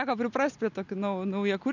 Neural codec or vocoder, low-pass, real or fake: none; 7.2 kHz; real